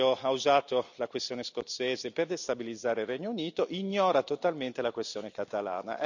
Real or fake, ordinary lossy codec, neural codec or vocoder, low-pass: real; none; none; 7.2 kHz